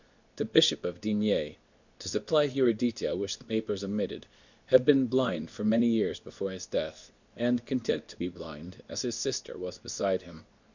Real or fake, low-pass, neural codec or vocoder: fake; 7.2 kHz; codec, 24 kHz, 0.9 kbps, WavTokenizer, medium speech release version 2